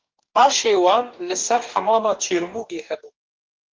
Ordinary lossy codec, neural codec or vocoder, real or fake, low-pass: Opus, 32 kbps; codec, 44.1 kHz, 2.6 kbps, DAC; fake; 7.2 kHz